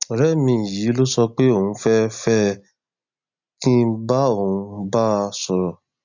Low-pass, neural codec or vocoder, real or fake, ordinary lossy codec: 7.2 kHz; none; real; none